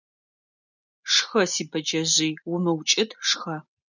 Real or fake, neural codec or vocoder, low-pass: real; none; 7.2 kHz